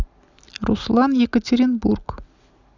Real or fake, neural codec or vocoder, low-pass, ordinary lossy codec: real; none; 7.2 kHz; none